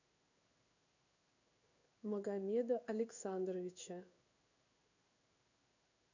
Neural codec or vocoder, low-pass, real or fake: codec, 16 kHz in and 24 kHz out, 1 kbps, XY-Tokenizer; 7.2 kHz; fake